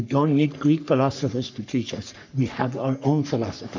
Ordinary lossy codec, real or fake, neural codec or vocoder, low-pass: MP3, 64 kbps; fake; codec, 44.1 kHz, 3.4 kbps, Pupu-Codec; 7.2 kHz